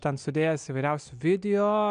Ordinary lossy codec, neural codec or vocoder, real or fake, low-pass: MP3, 96 kbps; none; real; 9.9 kHz